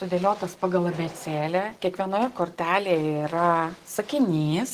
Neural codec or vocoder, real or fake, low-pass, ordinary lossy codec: none; real; 14.4 kHz; Opus, 16 kbps